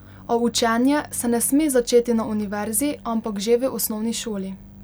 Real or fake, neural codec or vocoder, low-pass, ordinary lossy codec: real; none; none; none